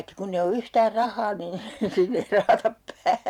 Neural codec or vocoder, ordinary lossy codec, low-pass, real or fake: vocoder, 44.1 kHz, 128 mel bands every 512 samples, BigVGAN v2; none; 19.8 kHz; fake